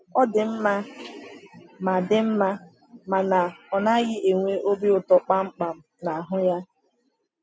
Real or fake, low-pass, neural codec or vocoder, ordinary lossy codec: real; none; none; none